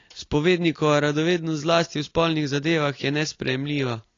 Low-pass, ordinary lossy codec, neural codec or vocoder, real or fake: 7.2 kHz; AAC, 32 kbps; none; real